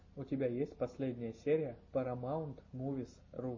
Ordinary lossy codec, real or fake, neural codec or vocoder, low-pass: MP3, 32 kbps; real; none; 7.2 kHz